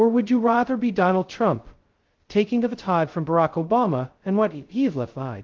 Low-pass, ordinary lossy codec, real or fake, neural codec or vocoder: 7.2 kHz; Opus, 16 kbps; fake; codec, 16 kHz, 0.2 kbps, FocalCodec